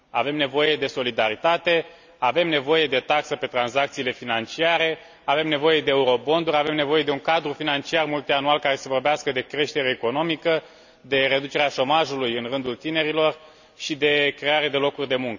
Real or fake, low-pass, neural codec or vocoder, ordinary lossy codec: real; 7.2 kHz; none; none